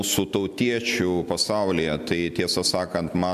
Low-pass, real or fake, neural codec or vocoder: 14.4 kHz; real; none